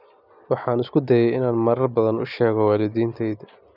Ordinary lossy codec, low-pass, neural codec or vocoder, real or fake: none; 5.4 kHz; none; real